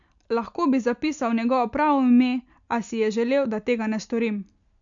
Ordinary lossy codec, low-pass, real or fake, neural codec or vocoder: none; 7.2 kHz; real; none